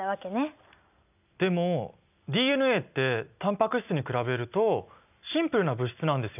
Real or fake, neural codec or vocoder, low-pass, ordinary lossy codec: real; none; 3.6 kHz; none